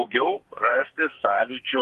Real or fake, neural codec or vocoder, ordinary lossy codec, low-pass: fake; codec, 44.1 kHz, 2.6 kbps, SNAC; Opus, 32 kbps; 14.4 kHz